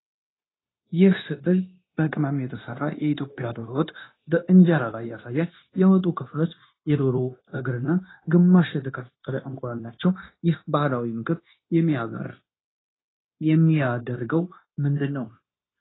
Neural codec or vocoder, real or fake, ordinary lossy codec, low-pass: codec, 16 kHz, 0.9 kbps, LongCat-Audio-Codec; fake; AAC, 16 kbps; 7.2 kHz